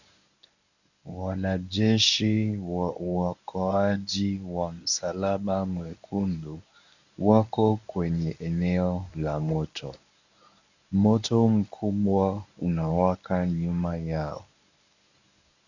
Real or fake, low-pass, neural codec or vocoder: fake; 7.2 kHz; codec, 24 kHz, 0.9 kbps, WavTokenizer, medium speech release version 1